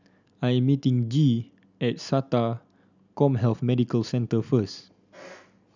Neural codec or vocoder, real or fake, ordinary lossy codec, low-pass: none; real; none; 7.2 kHz